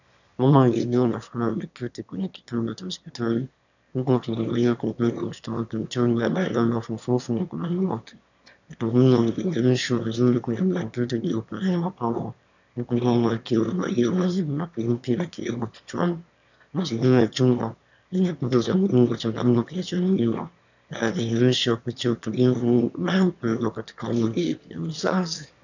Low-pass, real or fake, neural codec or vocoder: 7.2 kHz; fake; autoencoder, 22.05 kHz, a latent of 192 numbers a frame, VITS, trained on one speaker